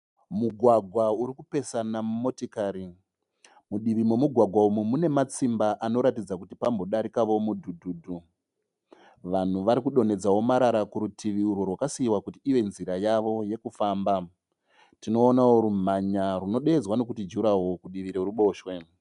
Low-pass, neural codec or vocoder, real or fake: 10.8 kHz; none; real